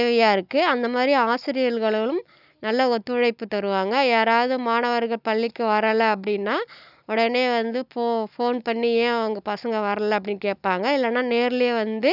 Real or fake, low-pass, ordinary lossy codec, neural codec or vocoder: real; 5.4 kHz; none; none